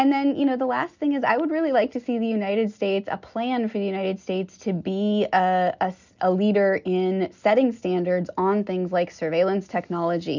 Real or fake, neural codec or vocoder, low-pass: real; none; 7.2 kHz